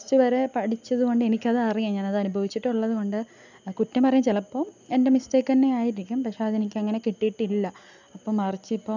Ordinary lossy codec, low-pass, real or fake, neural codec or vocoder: none; 7.2 kHz; real; none